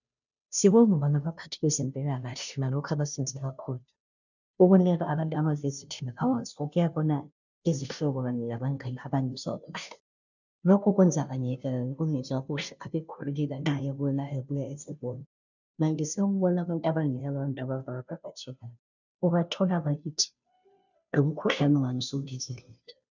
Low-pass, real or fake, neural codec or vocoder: 7.2 kHz; fake; codec, 16 kHz, 0.5 kbps, FunCodec, trained on Chinese and English, 25 frames a second